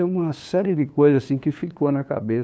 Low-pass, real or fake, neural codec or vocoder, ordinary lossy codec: none; fake; codec, 16 kHz, 2 kbps, FunCodec, trained on LibriTTS, 25 frames a second; none